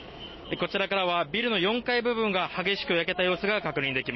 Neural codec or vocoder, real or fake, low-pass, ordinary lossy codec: none; real; 7.2 kHz; MP3, 24 kbps